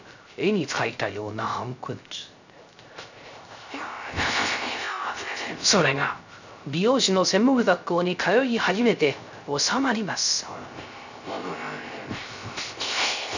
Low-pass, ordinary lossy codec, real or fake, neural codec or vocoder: 7.2 kHz; none; fake; codec, 16 kHz, 0.3 kbps, FocalCodec